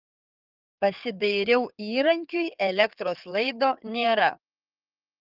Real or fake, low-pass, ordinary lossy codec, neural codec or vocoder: fake; 5.4 kHz; Opus, 24 kbps; codec, 16 kHz in and 24 kHz out, 2.2 kbps, FireRedTTS-2 codec